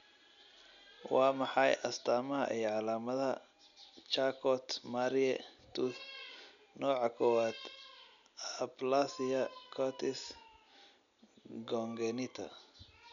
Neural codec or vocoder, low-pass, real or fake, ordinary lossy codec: none; 7.2 kHz; real; none